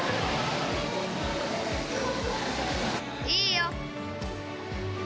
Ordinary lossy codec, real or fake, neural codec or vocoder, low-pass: none; real; none; none